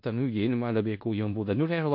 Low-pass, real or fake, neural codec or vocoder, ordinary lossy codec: 5.4 kHz; fake; codec, 16 kHz in and 24 kHz out, 0.4 kbps, LongCat-Audio-Codec, four codebook decoder; MP3, 32 kbps